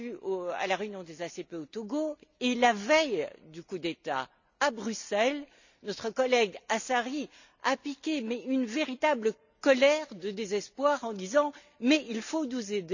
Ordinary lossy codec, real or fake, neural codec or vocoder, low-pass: none; real; none; 7.2 kHz